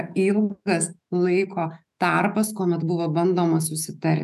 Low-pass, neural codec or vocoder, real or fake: 14.4 kHz; autoencoder, 48 kHz, 128 numbers a frame, DAC-VAE, trained on Japanese speech; fake